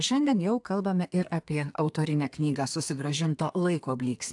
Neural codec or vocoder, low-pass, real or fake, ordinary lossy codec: codec, 32 kHz, 1.9 kbps, SNAC; 10.8 kHz; fake; AAC, 64 kbps